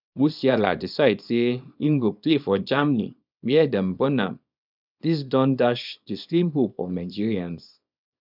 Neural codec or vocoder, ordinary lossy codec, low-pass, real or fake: codec, 24 kHz, 0.9 kbps, WavTokenizer, small release; none; 5.4 kHz; fake